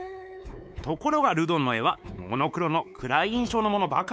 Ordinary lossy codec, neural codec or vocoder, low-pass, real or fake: none; codec, 16 kHz, 4 kbps, X-Codec, WavLM features, trained on Multilingual LibriSpeech; none; fake